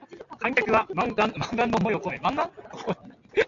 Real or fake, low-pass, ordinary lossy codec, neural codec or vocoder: real; 7.2 kHz; AAC, 48 kbps; none